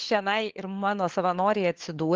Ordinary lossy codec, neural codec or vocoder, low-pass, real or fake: Opus, 16 kbps; codec, 16 kHz, 4 kbps, FunCodec, trained on LibriTTS, 50 frames a second; 7.2 kHz; fake